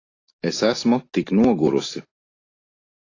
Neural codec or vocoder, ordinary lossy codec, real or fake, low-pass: none; AAC, 32 kbps; real; 7.2 kHz